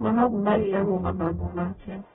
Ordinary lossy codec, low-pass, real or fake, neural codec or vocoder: AAC, 16 kbps; 19.8 kHz; fake; codec, 44.1 kHz, 0.9 kbps, DAC